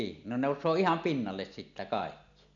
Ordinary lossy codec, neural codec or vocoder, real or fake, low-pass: none; none; real; 7.2 kHz